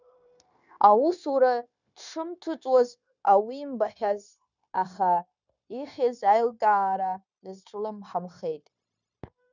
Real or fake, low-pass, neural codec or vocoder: fake; 7.2 kHz; codec, 16 kHz, 0.9 kbps, LongCat-Audio-Codec